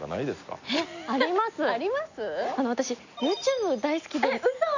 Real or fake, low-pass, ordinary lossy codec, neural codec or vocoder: real; 7.2 kHz; none; none